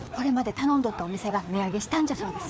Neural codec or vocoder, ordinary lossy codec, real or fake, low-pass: codec, 16 kHz, 4 kbps, FreqCodec, larger model; none; fake; none